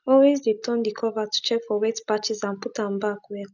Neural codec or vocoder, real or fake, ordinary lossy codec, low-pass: none; real; none; 7.2 kHz